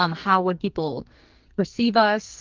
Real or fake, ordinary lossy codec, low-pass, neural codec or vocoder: fake; Opus, 32 kbps; 7.2 kHz; codec, 44.1 kHz, 2.6 kbps, SNAC